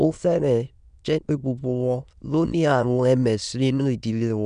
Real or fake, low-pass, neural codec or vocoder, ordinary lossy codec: fake; 9.9 kHz; autoencoder, 22.05 kHz, a latent of 192 numbers a frame, VITS, trained on many speakers; none